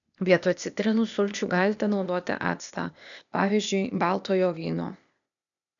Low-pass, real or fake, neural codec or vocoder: 7.2 kHz; fake; codec, 16 kHz, 0.8 kbps, ZipCodec